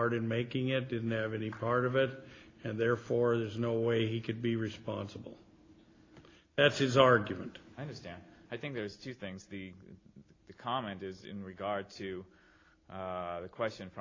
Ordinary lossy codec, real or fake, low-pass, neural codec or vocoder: AAC, 32 kbps; real; 7.2 kHz; none